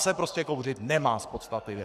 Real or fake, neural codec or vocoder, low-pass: fake; codec, 44.1 kHz, 7.8 kbps, Pupu-Codec; 14.4 kHz